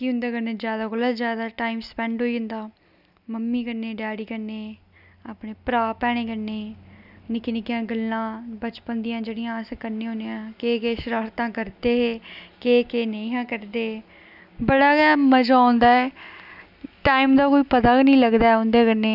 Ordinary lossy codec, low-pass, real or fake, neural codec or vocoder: none; 5.4 kHz; real; none